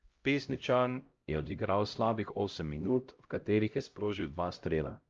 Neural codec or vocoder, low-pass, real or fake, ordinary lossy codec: codec, 16 kHz, 0.5 kbps, X-Codec, HuBERT features, trained on LibriSpeech; 7.2 kHz; fake; Opus, 24 kbps